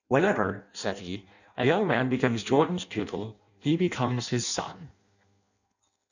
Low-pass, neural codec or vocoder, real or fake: 7.2 kHz; codec, 16 kHz in and 24 kHz out, 0.6 kbps, FireRedTTS-2 codec; fake